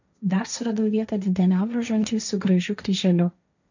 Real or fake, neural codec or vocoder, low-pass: fake; codec, 16 kHz, 1.1 kbps, Voila-Tokenizer; 7.2 kHz